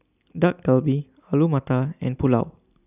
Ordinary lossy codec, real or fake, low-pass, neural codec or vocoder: none; real; 3.6 kHz; none